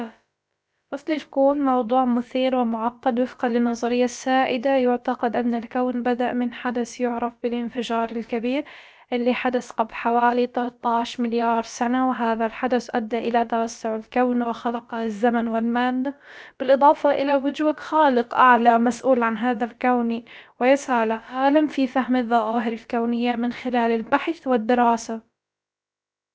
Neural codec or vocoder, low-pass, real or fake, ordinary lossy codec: codec, 16 kHz, about 1 kbps, DyCAST, with the encoder's durations; none; fake; none